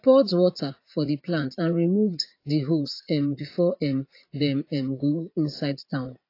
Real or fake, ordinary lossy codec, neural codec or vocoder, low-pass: fake; AAC, 32 kbps; vocoder, 44.1 kHz, 80 mel bands, Vocos; 5.4 kHz